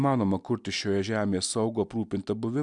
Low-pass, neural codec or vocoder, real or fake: 10.8 kHz; none; real